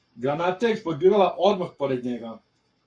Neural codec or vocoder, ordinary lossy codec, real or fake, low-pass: codec, 44.1 kHz, 7.8 kbps, Pupu-Codec; MP3, 48 kbps; fake; 9.9 kHz